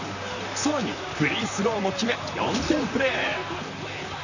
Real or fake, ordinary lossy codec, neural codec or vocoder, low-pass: fake; none; vocoder, 44.1 kHz, 128 mel bands, Pupu-Vocoder; 7.2 kHz